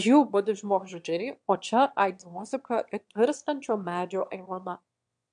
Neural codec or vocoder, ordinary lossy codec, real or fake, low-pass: autoencoder, 22.05 kHz, a latent of 192 numbers a frame, VITS, trained on one speaker; MP3, 64 kbps; fake; 9.9 kHz